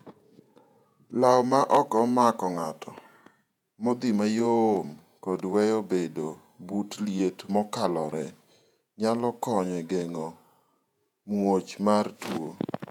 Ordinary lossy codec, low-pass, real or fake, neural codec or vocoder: none; 19.8 kHz; fake; vocoder, 48 kHz, 128 mel bands, Vocos